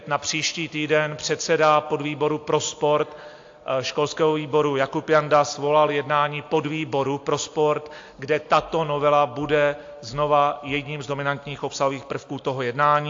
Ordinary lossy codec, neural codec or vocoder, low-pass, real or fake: AAC, 48 kbps; none; 7.2 kHz; real